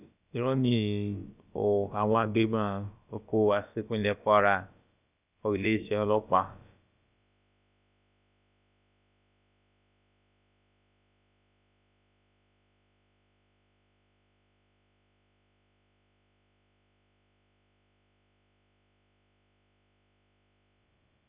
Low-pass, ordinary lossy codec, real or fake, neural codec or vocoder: 3.6 kHz; none; fake; codec, 16 kHz, about 1 kbps, DyCAST, with the encoder's durations